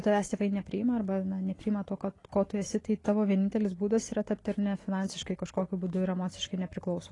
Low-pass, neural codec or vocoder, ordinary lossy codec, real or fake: 10.8 kHz; none; AAC, 32 kbps; real